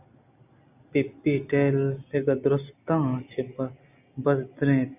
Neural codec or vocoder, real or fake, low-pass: none; real; 3.6 kHz